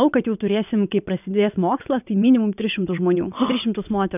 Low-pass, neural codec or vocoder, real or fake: 3.6 kHz; vocoder, 22.05 kHz, 80 mel bands, WaveNeXt; fake